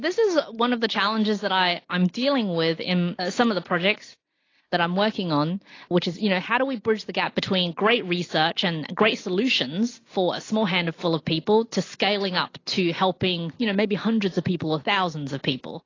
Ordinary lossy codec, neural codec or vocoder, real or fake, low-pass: AAC, 32 kbps; none; real; 7.2 kHz